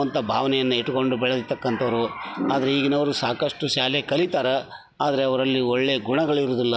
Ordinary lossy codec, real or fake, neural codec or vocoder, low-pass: none; real; none; none